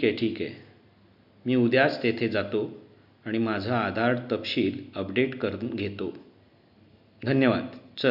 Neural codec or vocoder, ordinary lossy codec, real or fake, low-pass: none; none; real; 5.4 kHz